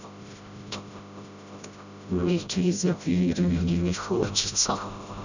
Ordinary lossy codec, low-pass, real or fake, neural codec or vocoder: none; 7.2 kHz; fake; codec, 16 kHz, 0.5 kbps, FreqCodec, smaller model